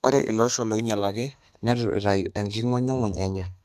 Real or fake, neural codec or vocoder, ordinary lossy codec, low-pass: fake; codec, 32 kHz, 1.9 kbps, SNAC; none; 14.4 kHz